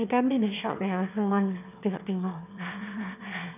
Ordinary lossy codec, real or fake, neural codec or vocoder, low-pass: none; fake; autoencoder, 22.05 kHz, a latent of 192 numbers a frame, VITS, trained on one speaker; 3.6 kHz